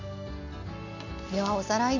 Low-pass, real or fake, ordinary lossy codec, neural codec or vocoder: 7.2 kHz; real; none; none